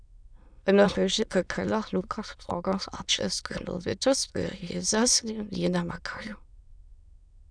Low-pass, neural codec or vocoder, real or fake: 9.9 kHz; autoencoder, 22.05 kHz, a latent of 192 numbers a frame, VITS, trained on many speakers; fake